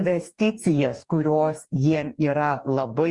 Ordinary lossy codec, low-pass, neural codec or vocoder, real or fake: Opus, 64 kbps; 10.8 kHz; codec, 44.1 kHz, 2.6 kbps, DAC; fake